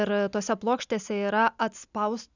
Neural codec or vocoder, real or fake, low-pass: none; real; 7.2 kHz